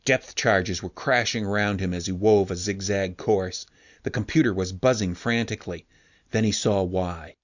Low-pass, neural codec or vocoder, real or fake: 7.2 kHz; none; real